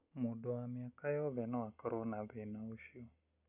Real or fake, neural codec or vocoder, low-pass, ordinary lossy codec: real; none; 3.6 kHz; none